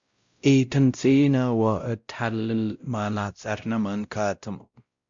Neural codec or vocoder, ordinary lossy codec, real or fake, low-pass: codec, 16 kHz, 0.5 kbps, X-Codec, WavLM features, trained on Multilingual LibriSpeech; Opus, 64 kbps; fake; 7.2 kHz